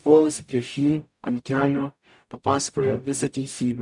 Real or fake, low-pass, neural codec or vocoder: fake; 10.8 kHz; codec, 44.1 kHz, 0.9 kbps, DAC